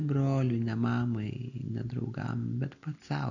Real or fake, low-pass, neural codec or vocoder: real; 7.2 kHz; none